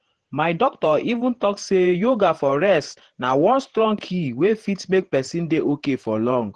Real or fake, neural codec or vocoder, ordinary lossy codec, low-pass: fake; vocoder, 48 kHz, 128 mel bands, Vocos; Opus, 16 kbps; 10.8 kHz